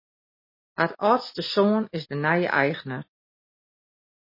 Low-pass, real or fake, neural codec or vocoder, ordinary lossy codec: 5.4 kHz; real; none; MP3, 24 kbps